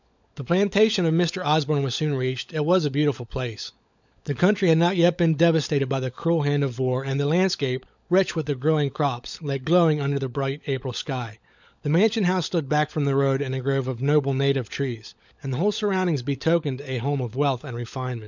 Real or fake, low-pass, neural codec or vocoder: fake; 7.2 kHz; codec, 16 kHz, 16 kbps, FunCodec, trained on LibriTTS, 50 frames a second